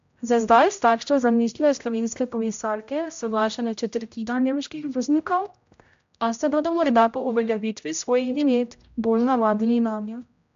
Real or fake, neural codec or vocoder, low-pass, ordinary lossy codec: fake; codec, 16 kHz, 0.5 kbps, X-Codec, HuBERT features, trained on general audio; 7.2 kHz; AAC, 64 kbps